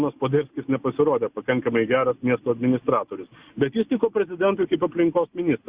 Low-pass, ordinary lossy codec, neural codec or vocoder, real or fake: 3.6 kHz; Opus, 64 kbps; none; real